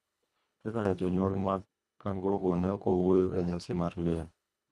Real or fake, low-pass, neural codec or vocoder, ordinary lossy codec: fake; none; codec, 24 kHz, 1.5 kbps, HILCodec; none